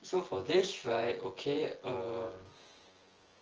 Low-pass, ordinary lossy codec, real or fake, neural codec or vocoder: 7.2 kHz; Opus, 16 kbps; fake; vocoder, 24 kHz, 100 mel bands, Vocos